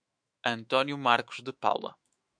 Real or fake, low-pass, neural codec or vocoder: fake; 9.9 kHz; autoencoder, 48 kHz, 128 numbers a frame, DAC-VAE, trained on Japanese speech